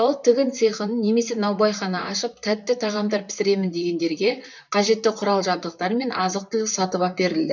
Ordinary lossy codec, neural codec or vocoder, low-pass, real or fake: none; vocoder, 44.1 kHz, 128 mel bands, Pupu-Vocoder; 7.2 kHz; fake